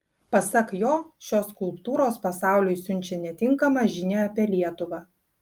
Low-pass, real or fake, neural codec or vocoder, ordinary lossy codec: 19.8 kHz; real; none; Opus, 32 kbps